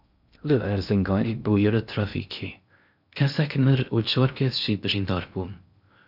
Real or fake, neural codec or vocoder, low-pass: fake; codec, 16 kHz in and 24 kHz out, 0.6 kbps, FocalCodec, streaming, 2048 codes; 5.4 kHz